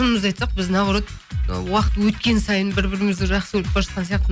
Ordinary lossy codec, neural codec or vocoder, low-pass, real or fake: none; none; none; real